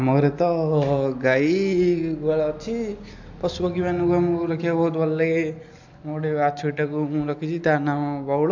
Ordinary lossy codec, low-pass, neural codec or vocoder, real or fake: AAC, 48 kbps; 7.2 kHz; none; real